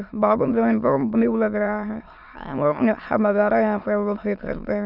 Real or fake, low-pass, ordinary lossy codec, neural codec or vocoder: fake; 5.4 kHz; none; autoencoder, 22.05 kHz, a latent of 192 numbers a frame, VITS, trained on many speakers